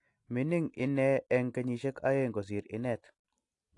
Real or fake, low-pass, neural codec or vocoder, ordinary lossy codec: real; 10.8 kHz; none; AAC, 48 kbps